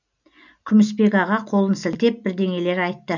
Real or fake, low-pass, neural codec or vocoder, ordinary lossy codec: real; 7.2 kHz; none; none